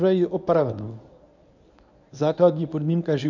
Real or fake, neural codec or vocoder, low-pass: fake; codec, 24 kHz, 0.9 kbps, WavTokenizer, medium speech release version 1; 7.2 kHz